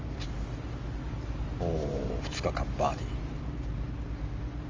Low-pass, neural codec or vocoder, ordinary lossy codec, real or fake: 7.2 kHz; none; Opus, 32 kbps; real